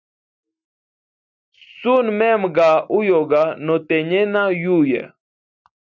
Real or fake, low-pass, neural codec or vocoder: real; 7.2 kHz; none